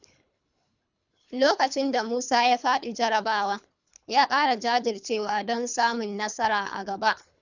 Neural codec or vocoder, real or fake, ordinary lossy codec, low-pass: codec, 24 kHz, 3 kbps, HILCodec; fake; none; 7.2 kHz